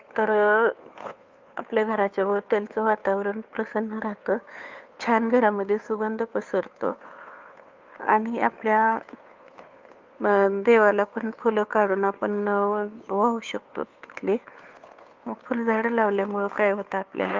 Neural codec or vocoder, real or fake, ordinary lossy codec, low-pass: codec, 16 kHz, 2 kbps, FunCodec, trained on Chinese and English, 25 frames a second; fake; Opus, 24 kbps; 7.2 kHz